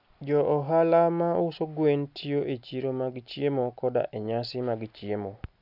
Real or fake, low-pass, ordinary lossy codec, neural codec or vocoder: real; 5.4 kHz; none; none